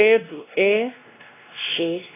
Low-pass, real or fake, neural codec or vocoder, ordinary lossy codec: 3.6 kHz; fake; codec, 16 kHz, 1 kbps, X-Codec, WavLM features, trained on Multilingual LibriSpeech; AAC, 24 kbps